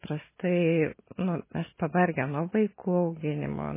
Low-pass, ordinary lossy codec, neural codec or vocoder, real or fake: 3.6 kHz; MP3, 16 kbps; none; real